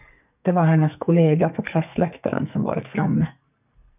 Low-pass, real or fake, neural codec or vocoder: 3.6 kHz; fake; codec, 44.1 kHz, 2.6 kbps, SNAC